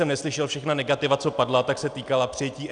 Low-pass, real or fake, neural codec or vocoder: 9.9 kHz; real; none